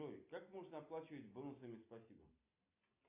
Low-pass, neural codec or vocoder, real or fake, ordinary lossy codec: 3.6 kHz; none; real; AAC, 32 kbps